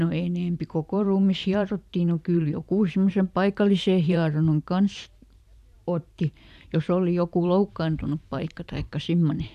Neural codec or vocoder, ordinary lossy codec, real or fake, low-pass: vocoder, 44.1 kHz, 128 mel bands every 512 samples, BigVGAN v2; none; fake; 14.4 kHz